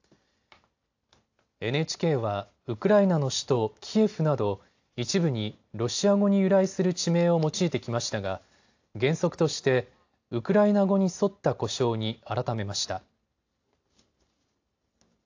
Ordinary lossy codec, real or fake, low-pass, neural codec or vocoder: AAC, 48 kbps; real; 7.2 kHz; none